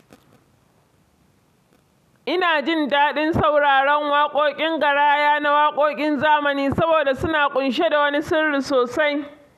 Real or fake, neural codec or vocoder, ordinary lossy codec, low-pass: real; none; none; 14.4 kHz